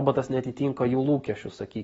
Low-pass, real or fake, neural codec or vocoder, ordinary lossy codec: 14.4 kHz; real; none; AAC, 24 kbps